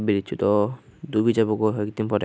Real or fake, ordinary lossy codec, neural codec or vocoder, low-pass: real; none; none; none